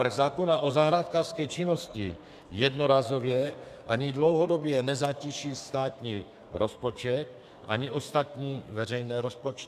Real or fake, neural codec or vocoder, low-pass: fake; codec, 32 kHz, 1.9 kbps, SNAC; 14.4 kHz